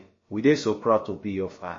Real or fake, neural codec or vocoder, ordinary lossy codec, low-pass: fake; codec, 16 kHz, about 1 kbps, DyCAST, with the encoder's durations; MP3, 32 kbps; 7.2 kHz